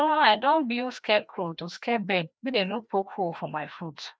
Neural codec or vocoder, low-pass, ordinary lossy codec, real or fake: codec, 16 kHz, 1 kbps, FreqCodec, larger model; none; none; fake